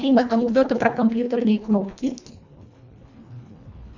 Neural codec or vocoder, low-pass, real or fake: codec, 24 kHz, 1.5 kbps, HILCodec; 7.2 kHz; fake